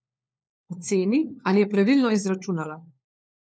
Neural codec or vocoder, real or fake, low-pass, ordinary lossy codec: codec, 16 kHz, 4 kbps, FunCodec, trained on LibriTTS, 50 frames a second; fake; none; none